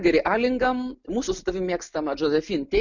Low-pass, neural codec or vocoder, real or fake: 7.2 kHz; none; real